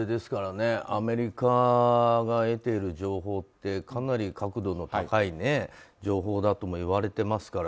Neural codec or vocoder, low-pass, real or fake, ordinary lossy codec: none; none; real; none